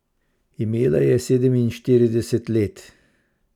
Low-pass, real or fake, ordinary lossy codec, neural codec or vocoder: 19.8 kHz; real; none; none